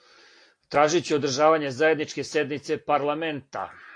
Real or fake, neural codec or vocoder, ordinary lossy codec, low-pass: real; none; AAC, 48 kbps; 9.9 kHz